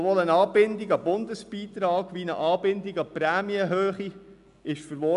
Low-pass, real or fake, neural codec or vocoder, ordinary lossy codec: 10.8 kHz; real; none; none